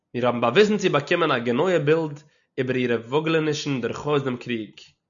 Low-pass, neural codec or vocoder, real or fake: 7.2 kHz; none; real